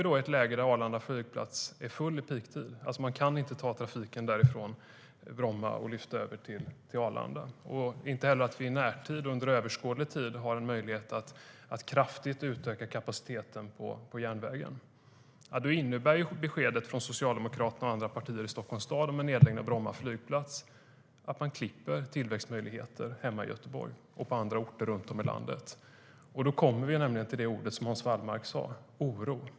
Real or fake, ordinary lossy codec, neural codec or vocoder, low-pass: real; none; none; none